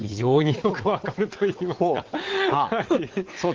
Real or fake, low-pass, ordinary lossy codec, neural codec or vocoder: fake; 7.2 kHz; Opus, 16 kbps; vocoder, 22.05 kHz, 80 mel bands, WaveNeXt